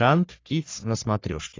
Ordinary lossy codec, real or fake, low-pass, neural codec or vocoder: AAC, 32 kbps; fake; 7.2 kHz; codec, 44.1 kHz, 3.4 kbps, Pupu-Codec